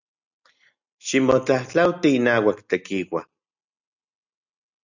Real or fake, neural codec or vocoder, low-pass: real; none; 7.2 kHz